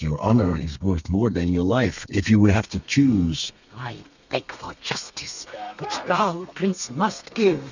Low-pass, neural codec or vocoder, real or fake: 7.2 kHz; codec, 32 kHz, 1.9 kbps, SNAC; fake